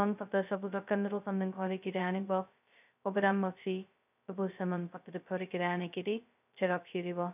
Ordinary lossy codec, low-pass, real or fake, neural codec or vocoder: none; 3.6 kHz; fake; codec, 16 kHz, 0.2 kbps, FocalCodec